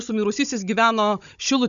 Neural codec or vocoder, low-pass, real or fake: codec, 16 kHz, 16 kbps, FunCodec, trained on Chinese and English, 50 frames a second; 7.2 kHz; fake